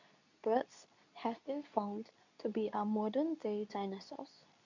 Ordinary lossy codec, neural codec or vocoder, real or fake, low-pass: none; codec, 24 kHz, 0.9 kbps, WavTokenizer, medium speech release version 2; fake; 7.2 kHz